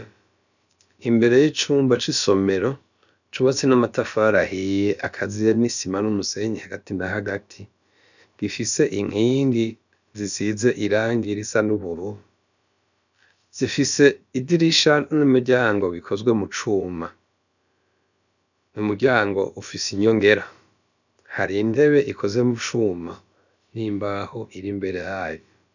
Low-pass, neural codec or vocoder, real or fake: 7.2 kHz; codec, 16 kHz, about 1 kbps, DyCAST, with the encoder's durations; fake